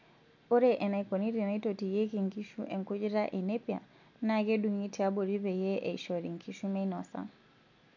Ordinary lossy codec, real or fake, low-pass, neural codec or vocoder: none; real; 7.2 kHz; none